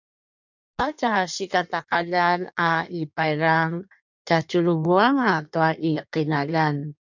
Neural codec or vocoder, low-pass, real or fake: codec, 16 kHz in and 24 kHz out, 1.1 kbps, FireRedTTS-2 codec; 7.2 kHz; fake